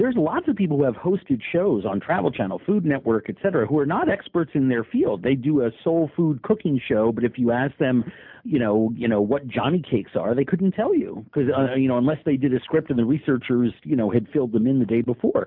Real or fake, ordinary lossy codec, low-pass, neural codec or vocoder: real; MP3, 48 kbps; 5.4 kHz; none